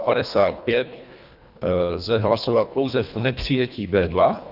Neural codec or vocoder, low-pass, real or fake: codec, 24 kHz, 1.5 kbps, HILCodec; 5.4 kHz; fake